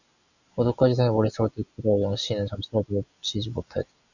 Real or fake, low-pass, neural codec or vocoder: real; 7.2 kHz; none